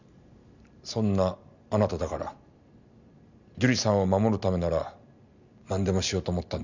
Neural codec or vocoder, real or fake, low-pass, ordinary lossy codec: none; real; 7.2 kHz; none